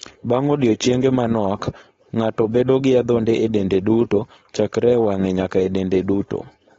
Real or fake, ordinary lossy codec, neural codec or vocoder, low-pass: fake; AAC, 24 kbps; codec, 16 kHz, 4.8 kbps, FACodec; 7.2 kHz